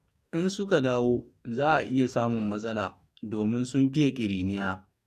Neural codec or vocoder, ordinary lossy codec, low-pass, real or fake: codec, 44.1 kHz, 2.6 kbps, DAC; none; 14.4 kHz; fake